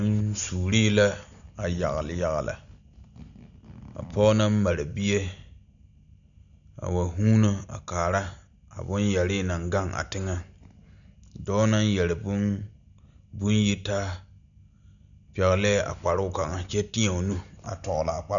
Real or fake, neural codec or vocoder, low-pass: real; none; 7.2 kHz